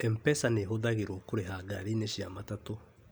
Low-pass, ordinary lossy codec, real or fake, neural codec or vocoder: none; none; real; none